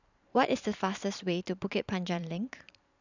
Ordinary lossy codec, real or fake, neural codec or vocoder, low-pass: none; fake; vocoder, 44.1 kHz, 128 mel bands every 512 samples, BigVGAN v2; 7.2 kHz